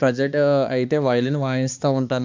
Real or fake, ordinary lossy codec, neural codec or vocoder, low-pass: fake; MP3, 64 kbps; codec, 16 kHz, 2 kbps, X-Codec, HuBERT features, trained on balanced general audio; 7.2 kHz